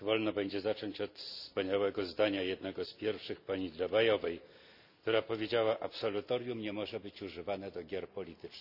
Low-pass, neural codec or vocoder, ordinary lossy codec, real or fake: 5.4 kHz; none; none; real